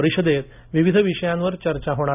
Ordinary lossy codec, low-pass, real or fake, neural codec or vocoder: none; 3.6 kHz; real; none